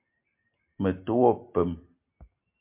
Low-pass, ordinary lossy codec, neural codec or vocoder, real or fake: 3.6 kHz; MP3, 32 kbps; none; real